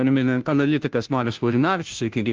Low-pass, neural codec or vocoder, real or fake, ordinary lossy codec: 7.2 kHz; codec, 16 kHz, 0.5 kbps, FunCodec, trained on Chinese and English, 25 frames a second; fake; Opus, 24 kbps